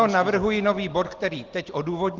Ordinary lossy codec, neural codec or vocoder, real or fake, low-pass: Opus, 16 kbps; none; real; 7.2 kHz